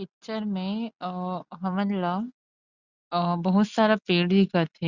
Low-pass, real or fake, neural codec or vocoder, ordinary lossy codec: 7.2 kHz; real; none; Opus, 64 kbps